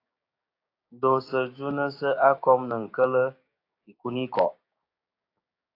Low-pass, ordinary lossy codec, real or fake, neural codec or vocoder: 5.4 kHz; AAC, 32 kbps; fake; codec, 16 kHz, 6 kbps, DAC